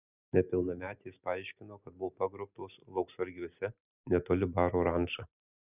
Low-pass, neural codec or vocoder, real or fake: 3.6 kHz; none; real